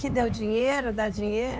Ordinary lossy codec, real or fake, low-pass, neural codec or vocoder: none; fake; none; codec, 16 kHz, 4 kbps, X-Codec, HuBERT features, trained on LibriSpeech